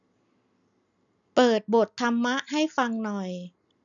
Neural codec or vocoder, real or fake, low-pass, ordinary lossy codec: none; real; 7.2 kHz; none